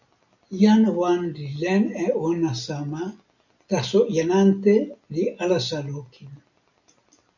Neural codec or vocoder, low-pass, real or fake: none; 7.2 kHz; real